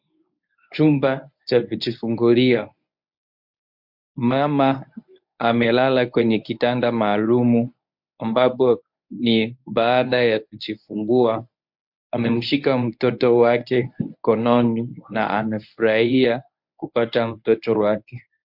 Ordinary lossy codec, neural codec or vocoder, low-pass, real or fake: MP3, 48 kbps; codec, 24 kHz, 0.9 kbps, WavTokenizer, medium speech release version 1; 5.4 kHz; fake